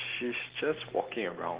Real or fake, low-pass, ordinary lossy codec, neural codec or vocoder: real; 3.6 kHz; Opus, 16 kbps; none